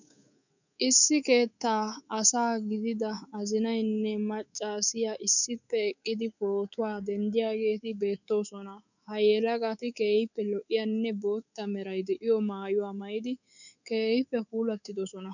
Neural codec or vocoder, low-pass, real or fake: codec, 24 kHz, 3.1 kbps, DualCodec; 7.2 kHz; fake